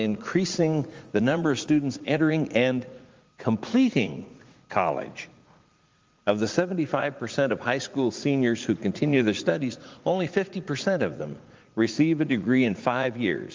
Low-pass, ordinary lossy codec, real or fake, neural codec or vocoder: 7.2 kHz; Opus, 32 kbps; fake; vocoder, 44.1 kHz, 80 mel bands, Vocos